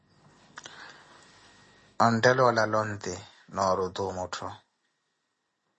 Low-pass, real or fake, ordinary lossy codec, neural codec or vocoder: 10.8 kHz; real; MP3, 32 kbps; none